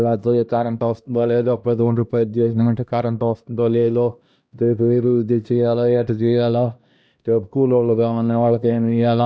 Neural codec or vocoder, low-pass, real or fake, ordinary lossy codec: codec, 16 kHz, 1 kbps, X-Codec, HuBERT features, trained on LibriSpeech; none; fake; none